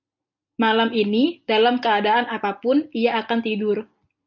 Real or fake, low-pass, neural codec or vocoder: real; 7.2 kHz; none